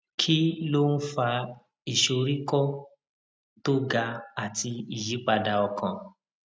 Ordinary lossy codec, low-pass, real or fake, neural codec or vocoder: none; none; real; none